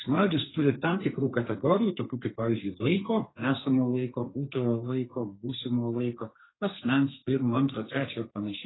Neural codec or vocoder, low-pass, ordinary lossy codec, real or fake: codec, 32 kHz, 1.9 kbps, SNAC; 7.2 kHz; AAC, 16 kbps; fake